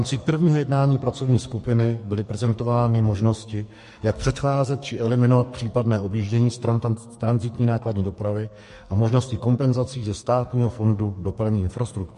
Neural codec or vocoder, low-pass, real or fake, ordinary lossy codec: codec, 44.1 kHz, 2.6 kbps, SNAC; 14.4 kHz; fake; MP3, 48 kbps